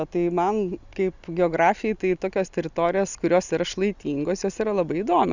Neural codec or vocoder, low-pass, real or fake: none; 7.2 kHz; real